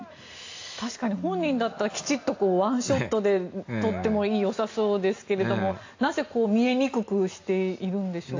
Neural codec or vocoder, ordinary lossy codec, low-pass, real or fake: none; AAC, 48 kbps; 7.2 kHz; real